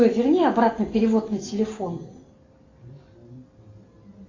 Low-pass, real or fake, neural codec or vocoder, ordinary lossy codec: 7.2 kHz; real; none; AAC, 32 kbps